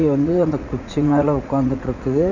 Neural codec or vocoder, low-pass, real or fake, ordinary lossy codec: vocoder, 44.1 kHz, 80 mel bands, Vocos; 7.2 kHz; fake; none